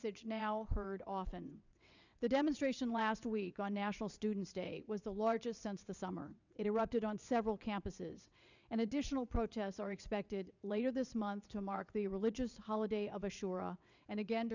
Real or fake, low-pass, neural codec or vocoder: fake; 7.2 kHz; vocoder, 22.05 kHz, 80 mel bands, WaveNeXt